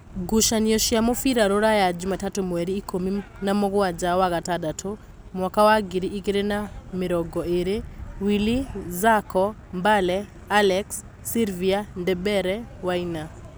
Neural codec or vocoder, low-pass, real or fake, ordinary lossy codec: none; none; real; none